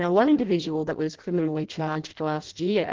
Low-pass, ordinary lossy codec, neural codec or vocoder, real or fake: 7.2 kHz; Opus, 16 kbps; codec, 16 kHz in and 24 kHz out, 0.6 kbps, FireRedTTS-2 codec; fake